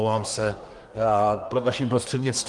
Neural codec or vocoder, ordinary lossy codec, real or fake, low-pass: codec, 24 kHz, 1 kbps, SNAC; Opus, 24 kbps; fake; 10.8 kHz